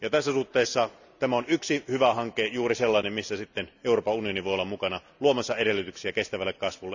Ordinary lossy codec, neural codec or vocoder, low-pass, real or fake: none; none; 7.2 kHz; real